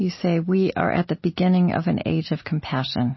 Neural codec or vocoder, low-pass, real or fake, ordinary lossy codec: none; 7.2 kHz; real; MP3, 24 kbps